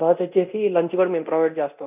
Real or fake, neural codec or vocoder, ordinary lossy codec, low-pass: fake; codec, 24 kHz, 0.9 kbps, DualCodec; none; 3.6 kHz